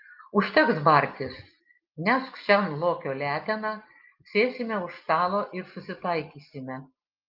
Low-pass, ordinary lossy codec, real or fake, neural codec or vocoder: 5.4 kHz; Opus, 32 kbps; real; none